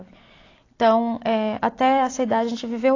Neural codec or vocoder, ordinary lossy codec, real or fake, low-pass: none; AAC, 32 kbps; real; 7.2 kHz